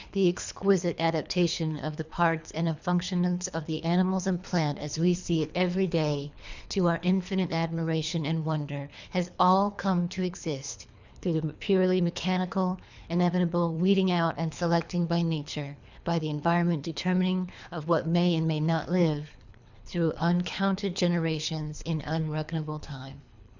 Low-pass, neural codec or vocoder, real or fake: 7.2 kHz; codec, 24 kHz, 3 kbps, HILCodec; fake